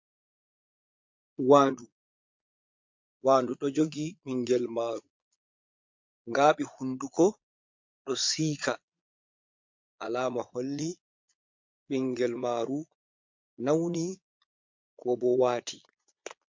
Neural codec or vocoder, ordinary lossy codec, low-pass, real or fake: vocoder, 22.05 kHz, 80 mel bands, Vocos; MP3, 48 kbps; 7.2 kHz; fake